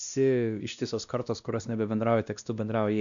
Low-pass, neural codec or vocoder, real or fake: 7.2 kHz; codec, 16 kHz, 1 kbps, X-Codec, WavLM features, trained on Multilingual LibriSpeech; fake